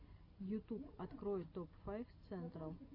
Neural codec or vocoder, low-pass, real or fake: none; 5.4 kHz; real